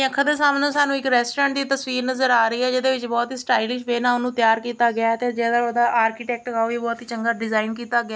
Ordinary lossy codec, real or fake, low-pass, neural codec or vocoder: none; real; none; none